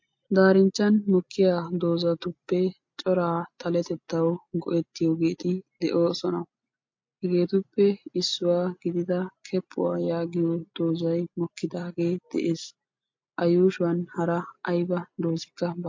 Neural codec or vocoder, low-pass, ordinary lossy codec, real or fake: none; 7.2 kHz; MP3, 48 kbps; real